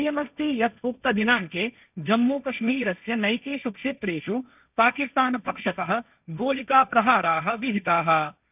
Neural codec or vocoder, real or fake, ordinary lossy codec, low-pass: codec, 16 kHz, 1.1 kbps, Voila-Tokenizer; fake; none; 3.6 kHz